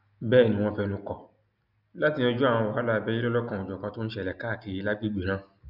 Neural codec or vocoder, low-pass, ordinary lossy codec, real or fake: none; 5.4 kHz; none; real